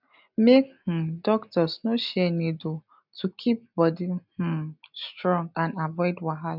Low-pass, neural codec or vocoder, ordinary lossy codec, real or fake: 5.4 kHz; none; none; real